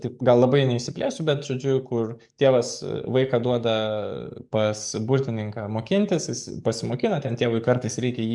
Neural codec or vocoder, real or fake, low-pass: codec, 44.1 kHz, 7.8 kbps, DAC; fake; 10.8 kHz